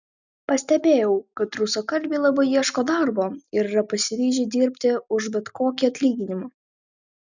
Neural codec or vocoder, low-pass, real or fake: none; 7.2 kHz; real